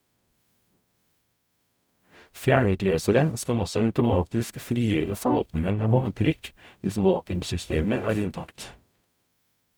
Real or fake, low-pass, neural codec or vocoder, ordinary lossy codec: fake; none; codec, 44.1 kHz, 0.9 kbps, DAC; none